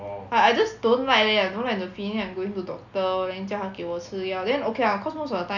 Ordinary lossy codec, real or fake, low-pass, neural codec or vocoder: none; real; 7.2 kHz; none